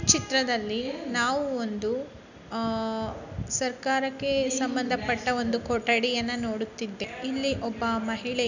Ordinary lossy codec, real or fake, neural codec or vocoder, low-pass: none; real; none; 7.2 kHz